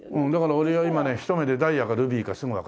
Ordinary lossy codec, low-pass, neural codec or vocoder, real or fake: none; none; none; real